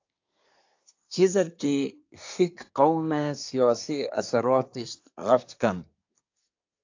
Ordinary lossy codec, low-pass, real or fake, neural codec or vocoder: AAC, 48 kbps; 7.2 kHz; fake; codec, 24 kHz, 1 kbps, SNAC